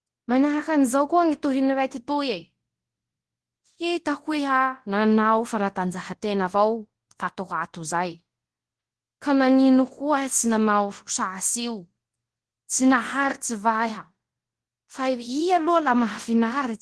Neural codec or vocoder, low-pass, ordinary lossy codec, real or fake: codec, 24 kHz, 0.9 kbps, WavTokenizer, large speech release; 10.8 kHz; Opus, 16 kbps; fake